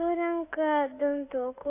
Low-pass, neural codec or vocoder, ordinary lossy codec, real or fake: 3.6 kHz; autoencoder, 48 kHz, 32 numbers a frame, DAC-VAE, trained on Japanese speech; AAC, 24 kbps; fake